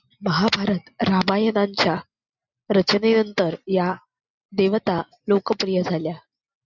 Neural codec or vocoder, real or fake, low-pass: none; real; 7.2 kHz